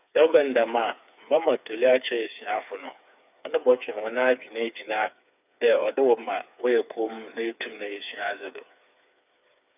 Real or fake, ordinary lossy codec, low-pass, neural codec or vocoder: fake; none; 3.6 kHz; codec, 16 kHz, 4 kbps, FreqCodec, smaller model